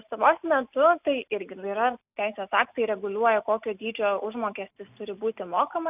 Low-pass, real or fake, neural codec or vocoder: 3.6 kHz; real; none